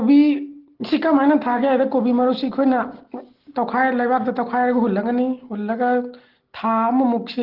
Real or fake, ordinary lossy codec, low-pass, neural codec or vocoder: real; Opus, 16 kbps; 5.4 kHz; none